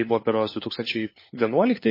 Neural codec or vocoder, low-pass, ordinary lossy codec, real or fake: codec, 16 kHz, 4 kbps, FunCodec, trained on LibriTTS, 50 frames a second; 5.4 kHz; MP3, 24 kbps; fake